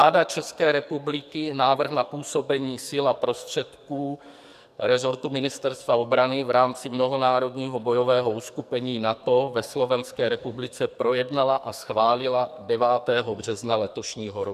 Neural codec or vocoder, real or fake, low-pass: codec, 44.1 kHz, 2.6 kbps, SNAC; fake; 14.4 kHz